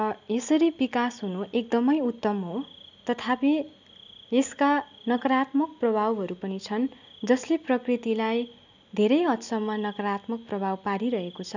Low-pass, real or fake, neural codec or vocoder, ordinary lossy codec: 7.2 kHz; real; none; none